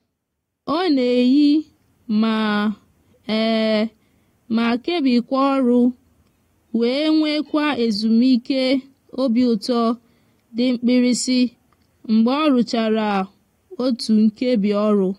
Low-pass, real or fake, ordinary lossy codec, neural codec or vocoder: 19.8 kHz; real; AAC, 48 kbps; none